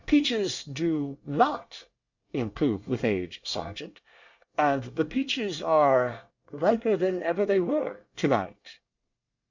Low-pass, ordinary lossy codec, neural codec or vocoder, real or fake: 7.2 kHz; Opus, 64 kbps; codec, 24 kHz, 1 kbps, SNAC; fake